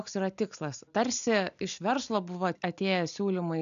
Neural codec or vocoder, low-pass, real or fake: none; 7.2 kHz; real